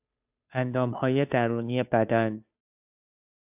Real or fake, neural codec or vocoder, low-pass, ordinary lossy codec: fake; codec, 16 kHz, 2 kbps, FunCodec, trained on Chinese and English, 25 frames a second; 3.6 kHz; AAC, 32 kbps